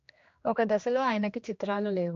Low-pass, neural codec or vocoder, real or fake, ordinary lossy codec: 7.2 kHz; codec, 16 kHz, 2 kbps, X-Codec, HuBERT features, trained on general audio; fake; AAC, 48 kbps